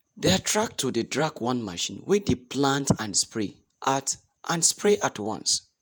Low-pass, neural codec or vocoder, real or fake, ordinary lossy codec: none; vocoder, 48 kHz, 128 mel bands, Vocos; fake; none